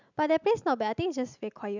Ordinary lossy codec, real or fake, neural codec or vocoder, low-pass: none; real; none; 7.2 kHz